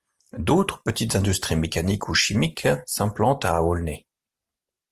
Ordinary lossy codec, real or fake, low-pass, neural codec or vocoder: Opus, 24 kbps; real; 14.4 kHz; none